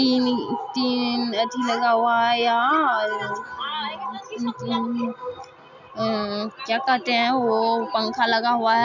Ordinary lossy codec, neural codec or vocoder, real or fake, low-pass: none; none; real; 7.2 kHz